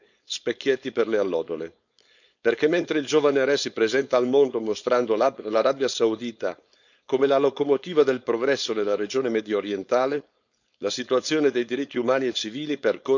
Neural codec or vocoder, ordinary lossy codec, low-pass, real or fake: codec, 16 kHz, 4.8 kbps, FACodec; none; 7.2 kHz; fake